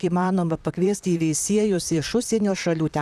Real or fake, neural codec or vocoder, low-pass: fake; vocoder, 44.1 kHz, 128 mel bands, Pupu-Vocoder; 14.4 kHz